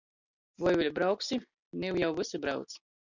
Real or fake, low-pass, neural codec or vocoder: real; 7.2 kHz; none